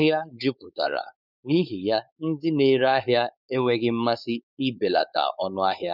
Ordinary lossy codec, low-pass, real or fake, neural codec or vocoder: none; 5.4 kHz; fake; codec, 16 kHz, 4.8 kbps, FACodec